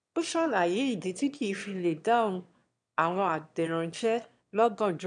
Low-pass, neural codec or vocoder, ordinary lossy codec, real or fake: 9.9 kHz; autoencoder, 22.05 kHz, a latent of 192 numbers a frame, VITS, trained on one speaker; none; fake